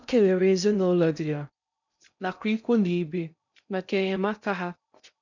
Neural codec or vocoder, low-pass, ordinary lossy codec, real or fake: codec, 16 kHz in and 24 kHz out, 0.6 kbps, FocalCodec, streaming, 2048 codes; 7.2 kHz; none; fake